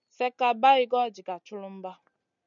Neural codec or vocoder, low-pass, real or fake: none; 7.2 kHz; real